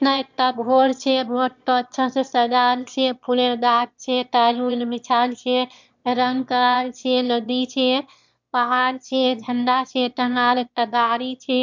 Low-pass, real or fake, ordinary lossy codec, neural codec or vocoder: 7.2 kHz; fake; MP3, 64 kbps; autoencoder, 22.05 kHz, a latent of 192 numbers a frame, VITS, trained on one speaker